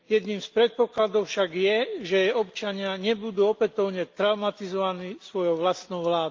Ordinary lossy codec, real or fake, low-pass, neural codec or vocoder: Opus, 32 kbps; real; 7.2 kHz; none